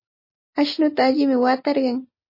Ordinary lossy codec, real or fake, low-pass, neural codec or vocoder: MP3, 24 kbps; real; 5.4 kHz; none